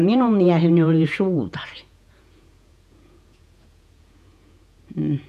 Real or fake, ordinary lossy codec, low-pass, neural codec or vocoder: fake; none; 14.4 kHz; vocoder, 48 kHz, 128 mel bands, Vocos